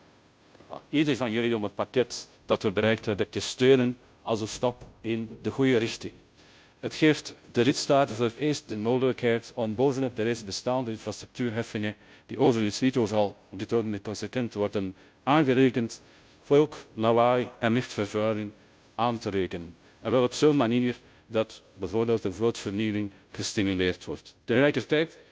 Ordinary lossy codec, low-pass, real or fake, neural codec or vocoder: none; none; fake; codec, 16 kHz, 0.5 kbps, FunCodec, trained on Chinese and English, 25 frames a second